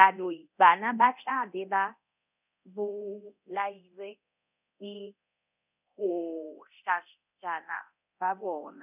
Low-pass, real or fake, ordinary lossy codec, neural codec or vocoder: 3.6 kHz; fake; none; codec, 24 kHz, 0.9 kbps, DualCodec